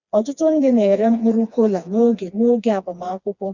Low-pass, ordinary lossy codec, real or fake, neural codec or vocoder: 7.2 kHz; Opus, 64 kbps; fake; codec, 16 kHz, 2 kbps, FreqCodec, smaller model